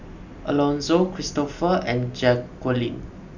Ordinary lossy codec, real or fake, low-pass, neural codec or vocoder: none; real; 7.2 kHz; none